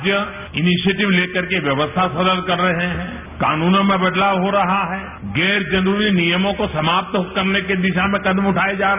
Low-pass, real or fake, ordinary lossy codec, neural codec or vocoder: 3.6 kHz; real; none; none